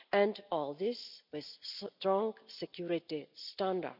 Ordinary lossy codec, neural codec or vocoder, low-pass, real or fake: none; none; 5.4 kHz; real